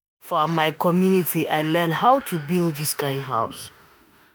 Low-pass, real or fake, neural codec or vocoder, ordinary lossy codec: none; fake; autoencoder, 48 kHz, 32 numbers a frame, DAC-VAE, trained on Japanese speech; none